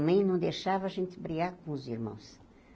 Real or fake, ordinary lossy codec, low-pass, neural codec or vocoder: real; none; none; none